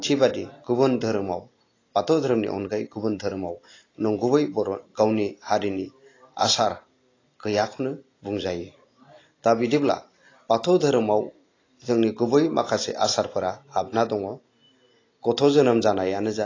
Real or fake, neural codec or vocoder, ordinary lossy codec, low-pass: real; none; AAC, 32 kbps; 7.2 kHz